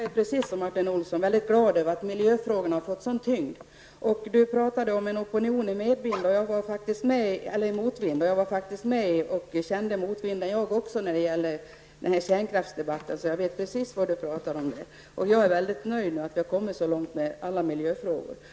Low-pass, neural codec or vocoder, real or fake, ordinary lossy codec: none; none; real; none